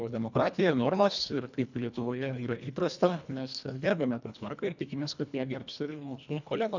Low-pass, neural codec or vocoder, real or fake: 7.2 kHz; codec, 24 kHz, 1.5 kbps, HILCodec; fake